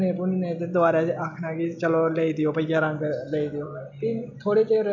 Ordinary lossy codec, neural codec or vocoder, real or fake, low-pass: none; none; real; 7.2 kHz